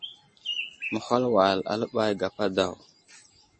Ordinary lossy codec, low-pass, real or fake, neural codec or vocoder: MP3, 32 kbps; 9.9 kHz; real; none